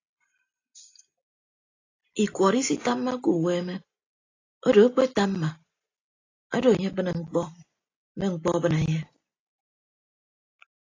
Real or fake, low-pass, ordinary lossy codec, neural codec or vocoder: real; 7.2 kHz; AAC, 32 kbps; none